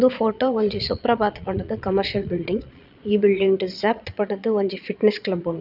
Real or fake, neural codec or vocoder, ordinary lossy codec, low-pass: fake; vocoder, 22.05 kHz, 80 mel bands, Vocos; none; 5.4 kHz